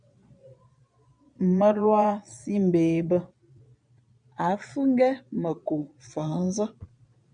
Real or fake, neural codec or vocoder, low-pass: fake; vocoder, 22.05 kHz, 80 mel bands, Vocos; 9.9 kHz